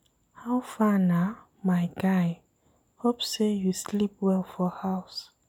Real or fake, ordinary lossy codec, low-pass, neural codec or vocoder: real; none; 19.8 kHz; none